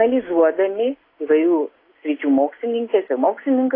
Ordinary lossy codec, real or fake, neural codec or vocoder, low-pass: AAC, 24 kbps; real; none; 5.4 kHz